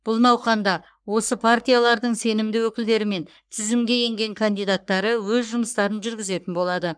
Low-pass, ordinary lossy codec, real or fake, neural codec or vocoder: 9.9 kHz; none; fake; codec, 44.1 kHz, 3.4 kbps, Pupu-Codec